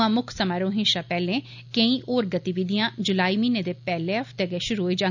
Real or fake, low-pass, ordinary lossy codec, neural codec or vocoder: real; 7.2 kHz; none; none